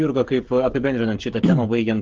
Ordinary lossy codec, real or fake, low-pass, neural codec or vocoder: Opus, 16 kbps; fake; 7.2 kHz; codec, 16 kHz, 16 kbps, FreqCodec, smaller model